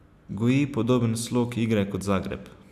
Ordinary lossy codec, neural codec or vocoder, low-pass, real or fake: none; none; 14.4 kHz; real